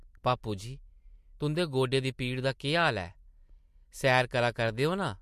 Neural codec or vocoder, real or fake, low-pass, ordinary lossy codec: none; real; 14.4 kHz; MP3, 64 kbps